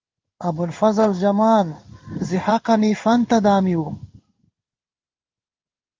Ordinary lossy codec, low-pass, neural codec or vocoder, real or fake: Opus, 24 kbps; 7.2 kHz; codec, 16 kHz in and 24 kHz out, 1 kbps, XY-Tokenizer; fake